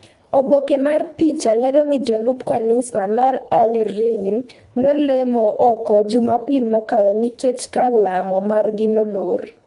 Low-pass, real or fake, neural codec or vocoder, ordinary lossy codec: 10.8 kHz; fake; codec, 24 kHz, 1.5 kbps, HILCodec; none